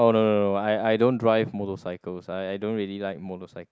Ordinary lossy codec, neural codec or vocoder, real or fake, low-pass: none; none; real; none